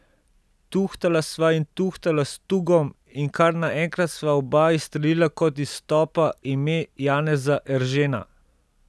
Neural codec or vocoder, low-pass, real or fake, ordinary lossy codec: none; none; real; none